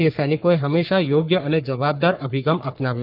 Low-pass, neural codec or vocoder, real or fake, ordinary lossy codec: 5.4 kHz; codec, 44.1 kHz, 3.4 kbps, Pupu-Codec; fake; none